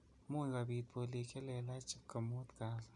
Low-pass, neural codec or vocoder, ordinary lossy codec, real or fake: 10.8 kHz; none; none; real